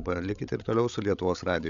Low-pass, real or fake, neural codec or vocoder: 7.2 kHz; fake; codec, 16 kHz, 16 kbps, FreqCodec, larger model